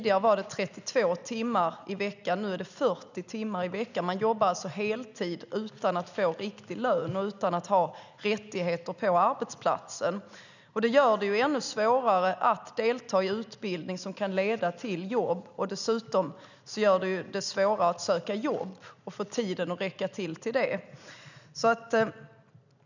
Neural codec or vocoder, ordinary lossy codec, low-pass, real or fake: none; none; 7.2 kHz; real